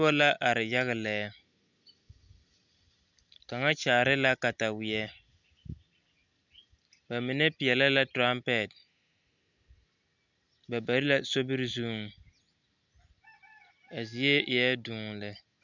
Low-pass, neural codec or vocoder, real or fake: 7.2 kHz; none; real